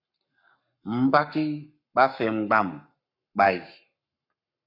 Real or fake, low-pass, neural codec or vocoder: fake; 5.4 kHz; codec, 44.1 kHz, 7.8 kbps, Pupu-Codec